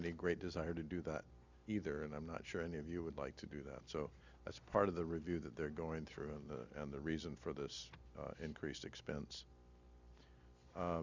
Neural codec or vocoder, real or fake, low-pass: none; real; 7.2 kHz